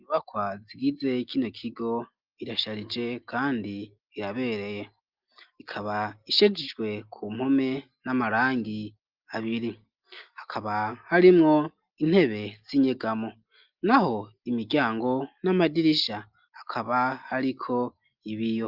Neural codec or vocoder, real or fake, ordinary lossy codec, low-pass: none; real; Opus, 32 kbps; 5.4 kHz